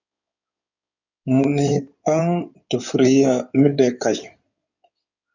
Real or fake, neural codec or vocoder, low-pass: fake; codec, 16 kHz in and 24 kHz out, 2.2 kbps, FireRedTTS-2 codec; 7.2 kHz